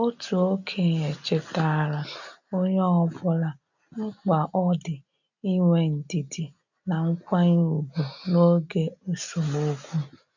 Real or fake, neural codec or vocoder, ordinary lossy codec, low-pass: real; none; none; 7.2 kHz